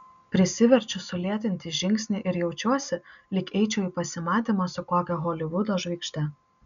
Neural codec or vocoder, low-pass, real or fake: none; 7.2 kHz; real